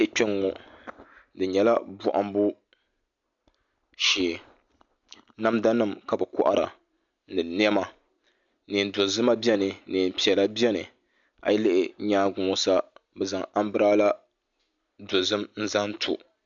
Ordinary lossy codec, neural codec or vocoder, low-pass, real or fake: MP3, 64 kbps; none; 7.2 kHz; real